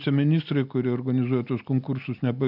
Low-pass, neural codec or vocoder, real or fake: 5.4 kHz; none; real